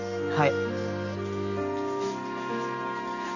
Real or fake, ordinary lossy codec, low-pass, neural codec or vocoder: real; none; 7.2 kHz; none